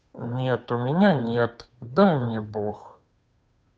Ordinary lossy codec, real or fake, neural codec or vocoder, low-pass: none; fake; codec, 16 kHz, 2 kbps, FunCodec, trained on Chinese and English, 25 frames a second; none